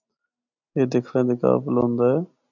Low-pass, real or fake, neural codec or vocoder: 7.2 kHz; real; none